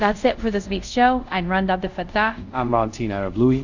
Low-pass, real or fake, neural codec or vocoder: 7.2 kHz; fake; codec, 24 kHz, 0.5 kbps, DualCodec